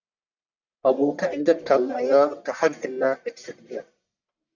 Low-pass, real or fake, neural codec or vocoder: 7.2 kHz; fake; codec, 44.1 kHz, 1.7 kbps, Pupu-Codec